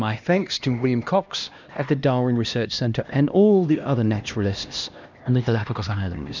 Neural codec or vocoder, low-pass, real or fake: codec, 16 kHz, 1 kbps, X-Codec, HuBERT features, trained on LibriSpeech; 7.2 kHz; fake